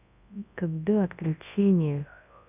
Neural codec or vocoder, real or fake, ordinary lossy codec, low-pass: codec, 24 kHz, 0.9 kbps, WavTokenizer, large speech release; fake; none; 3.6 kHz